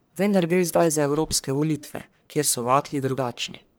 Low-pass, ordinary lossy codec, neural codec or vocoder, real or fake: none; none; codec, 44.1 kHz, 1.7 kbps, Pupu-Codec; fake